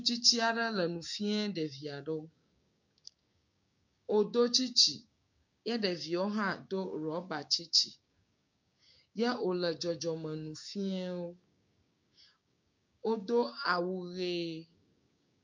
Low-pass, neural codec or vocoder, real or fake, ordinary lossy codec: 7.2 kHz; codec, 16 kHz, 6 kbps, DAC; fake; MP3, 48 kbps